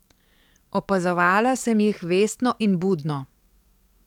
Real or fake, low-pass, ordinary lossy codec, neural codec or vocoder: fake; 19.8 kHz; none; codec, 44.1 kHz, 7.8 kbps, DAC